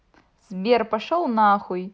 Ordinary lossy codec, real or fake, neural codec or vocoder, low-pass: none; real; none; none